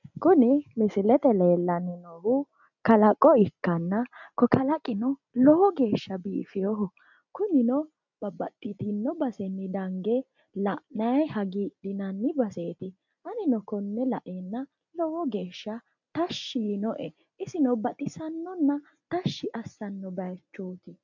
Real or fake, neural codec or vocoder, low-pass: real; none; 7.2 kHz